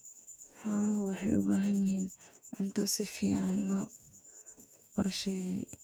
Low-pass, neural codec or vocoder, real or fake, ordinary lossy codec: none; codec, 44.1 kHz, 2.6 kbps, DAC; fake; none